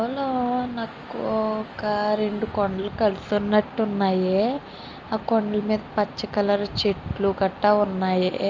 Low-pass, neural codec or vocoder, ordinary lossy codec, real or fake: 7.2 kHz; none; Opus, 24 kbps; real